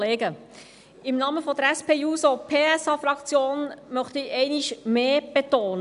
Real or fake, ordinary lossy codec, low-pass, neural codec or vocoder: real; none; 10.8 kHz; none